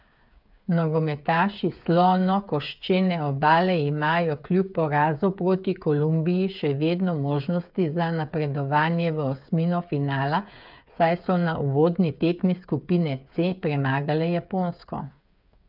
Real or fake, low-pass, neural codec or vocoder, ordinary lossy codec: fake; 5.4 kHz; codec, 16 kHz, 8 kbps, FreqCodec, smaller model; none